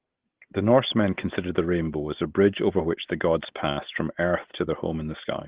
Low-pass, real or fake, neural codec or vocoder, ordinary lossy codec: 3.6 kHz; real; none; Opus, 16 kbps